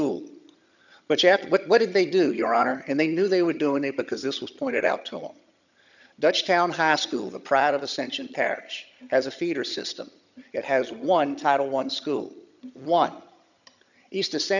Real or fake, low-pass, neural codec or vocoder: fake; 7.2 kHz; vocoder, 22.05 kHz, 80 mel bands, HiFi-GAN